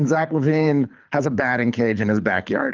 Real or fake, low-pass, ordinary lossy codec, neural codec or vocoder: fake; 7.2 kHz; Opus, 32 kbps; codec, 24 kHz, 6 kbps, HILCodec